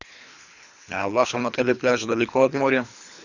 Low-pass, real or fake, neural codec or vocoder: 7.2 kHz; fake; codec, 24 kHz, 3 kbps, HILCodec